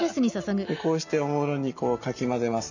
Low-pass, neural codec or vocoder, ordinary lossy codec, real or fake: 7.2 kHz; none; none; real